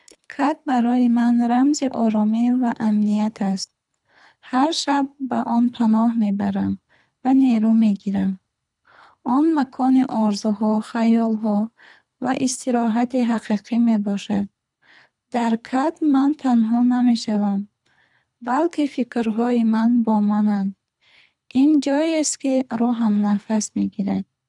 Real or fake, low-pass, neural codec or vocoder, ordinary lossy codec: fake; 10.8 kHz; codec, 24 kHz, 3 kbps, HILCodec; none